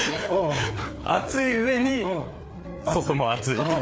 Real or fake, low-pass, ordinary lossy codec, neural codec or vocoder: fake; none; none; codec, 16 kHz, 4 kbps, FreqCodec, larger model